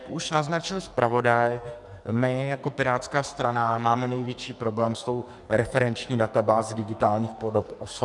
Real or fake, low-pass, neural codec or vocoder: fake; 10.8 kHz; codec, 44.1 kHz, 2.6 kbps, SNAC